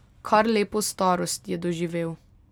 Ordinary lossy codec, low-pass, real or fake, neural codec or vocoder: none; none; real; none